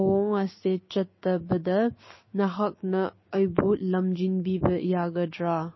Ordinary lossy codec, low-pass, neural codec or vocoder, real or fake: MP3, 24 kbps; 7.2 kHz; codec, 16 kHz, 6 kbps, DAC; fake